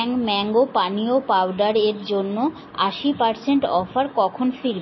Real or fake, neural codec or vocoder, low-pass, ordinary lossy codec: real; none; 7.2 kHz; MP3, 24 kbps